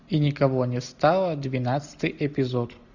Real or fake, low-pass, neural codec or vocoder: real; 7.2 kHz; none